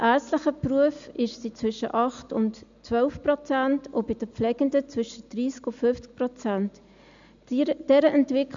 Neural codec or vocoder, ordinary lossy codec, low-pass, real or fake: none; MP3, 64 kbps; 7.2 kHz; real